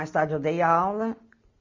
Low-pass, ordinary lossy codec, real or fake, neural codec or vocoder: 7.2 kHz; MP3, 48 kbps; real; none